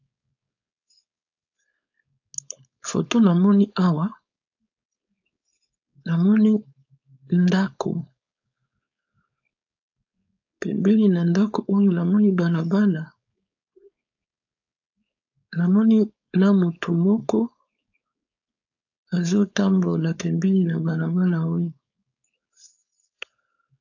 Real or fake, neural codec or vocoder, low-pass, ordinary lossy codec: fake; codec, 16 kHz, 4.8 kbps, FACodec; 7.2 kHz; AAC, 48 kbps